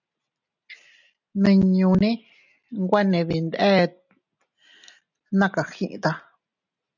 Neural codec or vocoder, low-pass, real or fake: none; 7.2 kHz; real